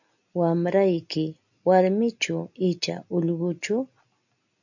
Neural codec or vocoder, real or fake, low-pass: none; real; 7.2 kHz